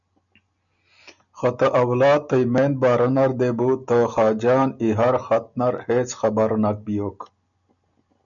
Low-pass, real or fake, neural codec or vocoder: 7.2 kHz; real; none